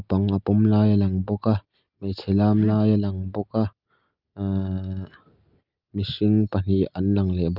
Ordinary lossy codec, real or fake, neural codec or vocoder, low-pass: Opus, 24 kbps; real; none; 5.4 kHz